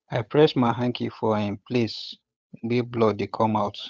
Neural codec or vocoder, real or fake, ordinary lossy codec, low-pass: codec, 16 kHz, 8 kbps, FunCodec, trained on Chinese and English, 25 frames a second; fake; none; none